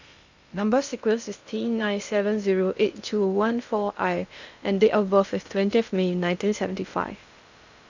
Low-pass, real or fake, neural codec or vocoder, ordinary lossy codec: 7.2 kHz; fake; codec, 16 kHz in and 24 kHz out, 0.6 kbps, FocalCodec, streaming, 2048 codes; none